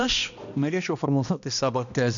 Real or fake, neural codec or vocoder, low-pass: fake; codec, 16 kHz, 1 kbps, X-Codec, HuBERT features, trained on balanced general audio; 7.2 kHz